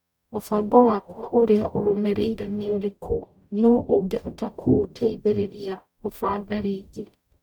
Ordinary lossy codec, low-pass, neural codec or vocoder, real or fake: none; 19.8 kHz; codec, 44.1 kHz, 0.9 kbps, DAC; fake